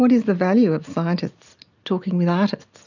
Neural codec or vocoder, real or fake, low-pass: none; real; 7.2 kHz